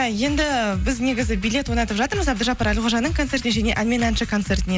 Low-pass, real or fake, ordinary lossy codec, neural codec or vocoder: none; real; none; none